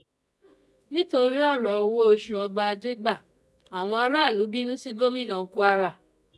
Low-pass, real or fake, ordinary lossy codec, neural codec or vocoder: none; fake; none; codec, 24 kHz, 0.9 kbps, WavTokenizer, medium music audio release